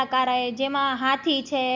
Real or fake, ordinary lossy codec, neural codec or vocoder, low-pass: real; none; none; 7.2 kHz